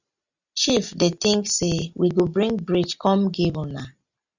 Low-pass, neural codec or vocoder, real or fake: 7.2 kHz; none; real